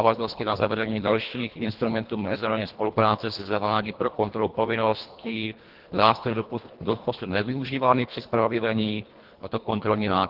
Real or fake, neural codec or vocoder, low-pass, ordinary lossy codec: fake; codec, 24 kHz, 1.5 kbps, HILCodec; 5.4 kHz; Opus, 16 kbps